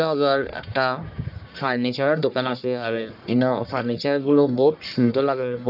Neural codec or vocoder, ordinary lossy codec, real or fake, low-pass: codec, 44.1 kHz, 1.7 kbps, Pupu-Codec; none; fake; 5.4 kHz